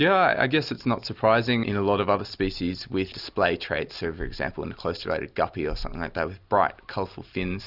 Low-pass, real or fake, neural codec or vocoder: 5.4 kHz; real; none